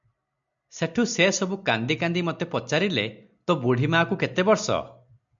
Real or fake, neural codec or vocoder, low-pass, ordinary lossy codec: real; none; 7.2 kHz; MP3, 64 kbps